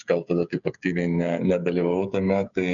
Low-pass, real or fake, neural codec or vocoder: 7.2 kHz; fake; codec, 16 kHz, 8 kbps, FreqCodec, smaller model